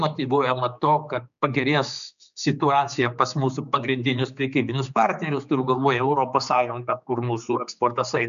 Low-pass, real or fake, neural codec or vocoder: 7.2 kHz; fake; codec, 16 kHz, 4 kbps, X-Codec, HuBERT features, trained on general audio